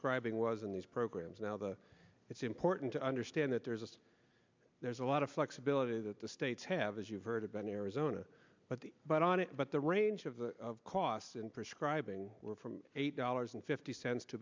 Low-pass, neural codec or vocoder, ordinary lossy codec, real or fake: 7.2 kHz; none; MP3, 64 kbps; real